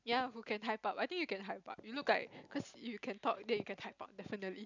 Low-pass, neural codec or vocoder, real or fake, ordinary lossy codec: 7.2 kHz; none; real; none